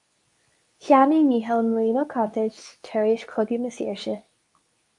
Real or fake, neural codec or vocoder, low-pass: fake; codec, 24 kHz, 0.9 kbps, WavTokenizer, medium speech release version 2; 10.8 kHz